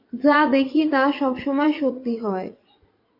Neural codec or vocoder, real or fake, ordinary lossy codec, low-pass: vocoder, 44.1 kHz, 80 mel bands, Vocos; fake; AAC, 32 kbps; 5.4 kHz